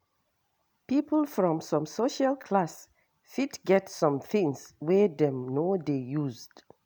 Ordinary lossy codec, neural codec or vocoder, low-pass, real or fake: none; none; none; real